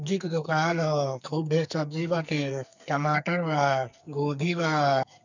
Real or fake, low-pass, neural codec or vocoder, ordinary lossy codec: fake; 7.2 kHz; codec, 44.1 kHz, 2.6 kbps, SNAC; none